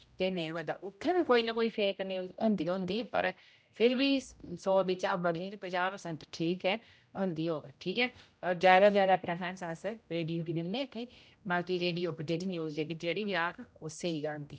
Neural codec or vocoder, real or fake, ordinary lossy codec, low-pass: codec, 16 kHz, 0.5 kbps, X-Codec, HuBERT features, trained on general audio; fake; none; none